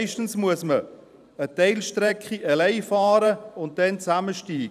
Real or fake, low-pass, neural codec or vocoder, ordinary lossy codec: fake; 14.4 kHz; vocoder, 44.1 kHz, 128 mel bands every 512 samples, BigVGAN v2; none